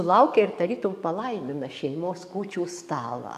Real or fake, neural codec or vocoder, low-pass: fake; codec, 44.1 kHz, 7.8 kbps, DAC; 14.4 kHz